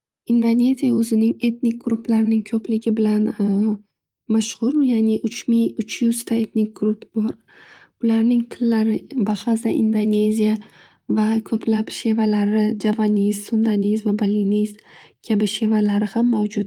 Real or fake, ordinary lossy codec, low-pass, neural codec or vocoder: fake; Opus, 32 kbps; 19.8 kHz; vocoder, 44.1 kHz, 128 mel bands, Pupu-Vocoder